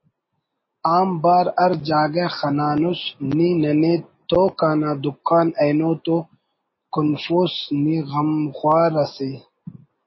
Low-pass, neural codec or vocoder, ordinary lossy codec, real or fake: 7.2 kHz; none; MP3, 24 kbps; real